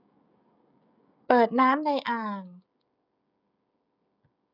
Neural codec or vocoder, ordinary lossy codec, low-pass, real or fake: codec, 16 kHz, 16 kbps, FreqCodec, smaller model; none; 5.4 kHz; fake